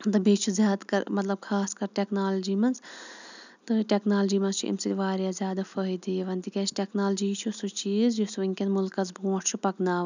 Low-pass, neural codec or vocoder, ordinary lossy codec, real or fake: 7.2 kHz; none; none; real